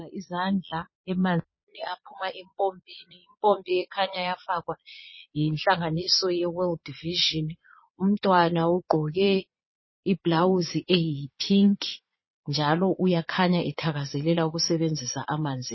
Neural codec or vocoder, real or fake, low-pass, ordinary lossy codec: vocoder, 22.05 kHz, 80 mel bands, Vocos; fake; 7.2 kHz; MP3, 24 kbps